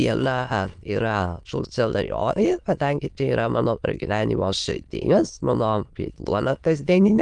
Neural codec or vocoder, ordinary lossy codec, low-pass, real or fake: autoencoder, 22.05 kHz, a latent of 192 numbers a frame, VITS, trained on many speakers; Opus, 32 kbps; 9.9 kHz; fake